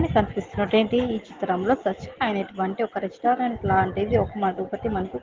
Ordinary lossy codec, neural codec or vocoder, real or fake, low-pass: Opus, 16 kbps; none; real; 7.2 kHz